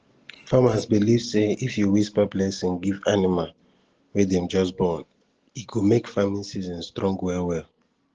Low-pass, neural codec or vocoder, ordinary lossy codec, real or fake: 7.2 kHz; none; Opus, 16 kbps; real